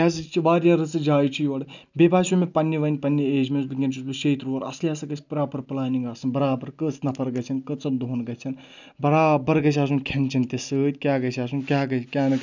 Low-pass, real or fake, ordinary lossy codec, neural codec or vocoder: 7.2 kHz; real; none; none